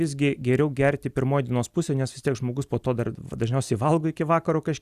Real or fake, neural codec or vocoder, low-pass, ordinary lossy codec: real; none; 14.4 kHz; Opus, 64 kbps